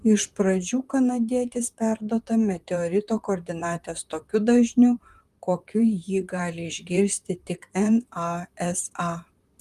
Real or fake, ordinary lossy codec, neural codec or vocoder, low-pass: fake; Opus, 32 kbps; vocoder, 44.1 kHz, 128 mel bands, Pupu-Vocoder; 14.4 kHz